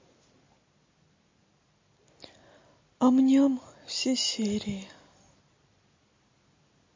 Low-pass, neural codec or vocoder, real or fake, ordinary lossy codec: 7.2 kHz; none; real; MP3, 32 kbps